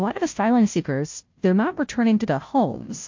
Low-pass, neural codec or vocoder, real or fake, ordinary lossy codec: 7.2 kHz; codec, 16 kHz, 0.5 kbps, FunCodec, trained on Chinese and English, 25 frames a second; fake; MP3, 48 kbps